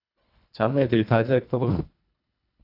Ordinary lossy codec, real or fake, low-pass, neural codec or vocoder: none; fake; 5.4 kHz; codec, 24 kHz, 1.5 kbps, HILCodec